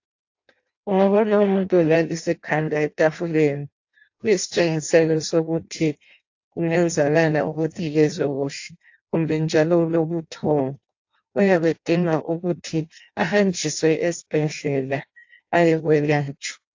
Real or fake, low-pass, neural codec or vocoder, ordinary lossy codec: fake; 7.2 kHz; codec, 16 kHz in and 24 kHz out, 0.6 kbps, FireRedTTS-2 codec; AAC, 48 kbps